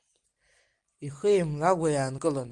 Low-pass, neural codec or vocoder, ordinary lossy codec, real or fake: 9.9 kHz; none; Opus, 24 kbps; real